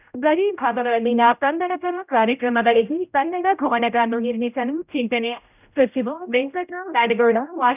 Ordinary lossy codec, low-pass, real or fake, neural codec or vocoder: none; 3.6 kHz; fake; codec, 16 kHz, 0.5 kbps, X-Codec, HuBERT features, trained on general audio